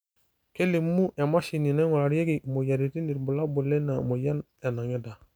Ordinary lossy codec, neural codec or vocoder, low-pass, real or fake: none; none; none; real